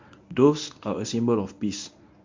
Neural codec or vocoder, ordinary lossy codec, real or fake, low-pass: codec, 24 kHz, 0.9 kbps, WavTokenizer, medium speech release version 1; MP3, 48 kbps; fake; 7.2 kHz